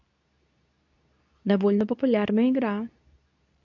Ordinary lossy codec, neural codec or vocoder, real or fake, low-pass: none; codec, 24 kHz, 0.9 kbps, WavTokenizer, medium speech release version 2; fake; 7.2 kHz